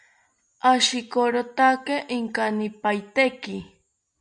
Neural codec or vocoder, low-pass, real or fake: none; 9.9 kHz; real